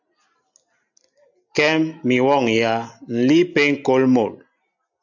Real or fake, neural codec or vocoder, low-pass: real; none; 7.2 kHz